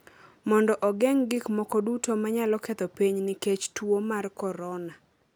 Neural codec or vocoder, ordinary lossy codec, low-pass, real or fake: none; none; none; real